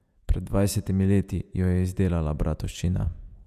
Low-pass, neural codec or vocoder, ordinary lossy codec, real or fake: 14.4 kHz; vocoder, 48 kHz, 128 mel bands, Vocos; none; fake